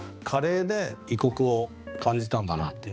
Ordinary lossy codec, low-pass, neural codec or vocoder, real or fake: none; none; codec, 16 kHz, 2 kbps, X-Codec, HuBERT features, trained on balanced general audio; fake